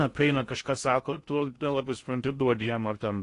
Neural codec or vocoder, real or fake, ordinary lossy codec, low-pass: codec, 16 kHz in and 24 kHz out, 0.6 kbps, FocalCodec, streaming, 2048 codes; fake; AAC, 48 kbps; 10.8 kHz